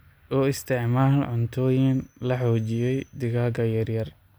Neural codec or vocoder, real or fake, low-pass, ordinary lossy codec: none; real; none; none